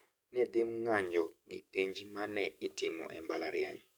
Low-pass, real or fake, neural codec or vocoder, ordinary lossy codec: none; fake; codec, 44.1 kHz, 7.8 kbps, DAC; none